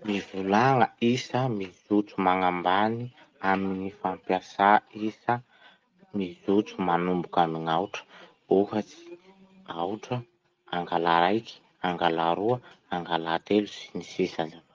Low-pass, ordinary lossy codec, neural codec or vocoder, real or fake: 7.2 kHz; Opus, 24 kbps; none; real